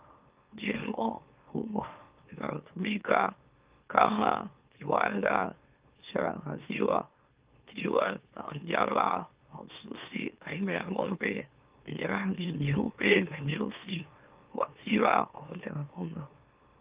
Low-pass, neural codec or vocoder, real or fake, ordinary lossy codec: 3.6 kHz; autoencoder, 44.1 kHz, a latent of 192 numbers a frame, MeloTTS; fake; Opus, 32 kbps